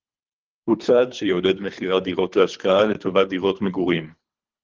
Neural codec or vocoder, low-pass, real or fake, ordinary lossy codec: codec, 24 kHz, 3 kbps, HILCodec; 7.2 kHz; fake; Opus, 32 kbps